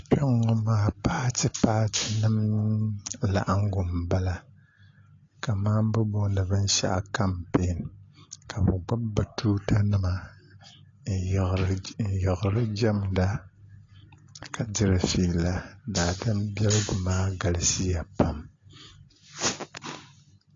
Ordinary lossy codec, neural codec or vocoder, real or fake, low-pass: AAC, 32 kbps; none; real; 7.2 kHz